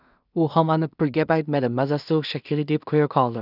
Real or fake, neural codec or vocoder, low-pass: fake; codec, 16 kHz in and 24 kHz out, 0.4 kbps, LongCat-Audio-Codec, two codebook decoder; 5.4 kHz